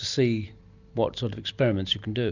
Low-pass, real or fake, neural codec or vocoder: 7.2 kHz; real; none